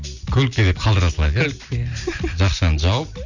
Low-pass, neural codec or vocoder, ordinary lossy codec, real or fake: 7.2 kHz; none; none; real